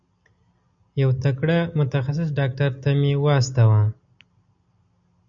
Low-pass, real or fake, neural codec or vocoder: 7.2 kHz; real; none